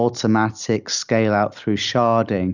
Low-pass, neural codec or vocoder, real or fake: 7.2 kHz; none; real